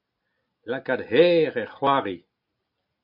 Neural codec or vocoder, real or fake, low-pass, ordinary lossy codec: none; real; 5.4 kHz; MP3, 48 kbps